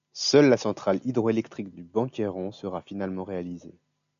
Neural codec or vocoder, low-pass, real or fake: none; 7.2 kHz; real